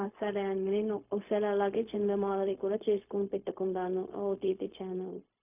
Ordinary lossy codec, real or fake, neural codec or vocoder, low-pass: none; fake; codec, 16 kHz, 0.4 kbps, LongCat-Audio-Codec; 3.6 kHz